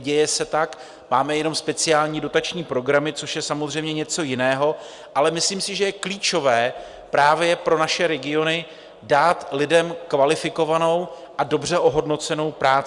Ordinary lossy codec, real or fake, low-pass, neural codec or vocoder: Opus, 64 kbps; real; 10.8 kHz; none